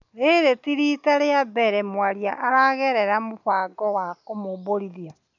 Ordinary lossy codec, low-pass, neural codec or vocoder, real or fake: none; 7.2 kHz; none; real